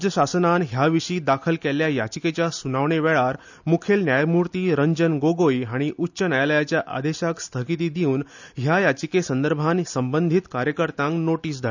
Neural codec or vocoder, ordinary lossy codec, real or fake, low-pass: none; none; real; 7.2 kHz